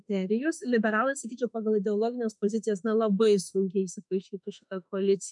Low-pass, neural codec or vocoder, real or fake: 10.8 kHz; autoencoder, 48 kHz, 32 numbers a frame, DAC-VAE, trained on Japanese speech; fake